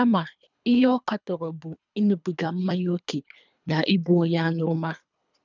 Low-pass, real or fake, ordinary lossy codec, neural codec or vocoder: 7.2 kHz; fake; none; codec, 24 kHz, 3 kbps, HILCodec